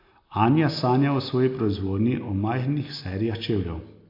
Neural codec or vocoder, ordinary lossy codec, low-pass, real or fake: none; AAC, 32 kbps; 5.4 kHz; real